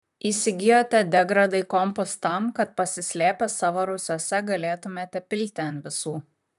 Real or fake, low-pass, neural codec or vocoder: fake; 14.4 kHz; vocoder, 44.1 kHz, 128 mel bands, Pupu-Vocoder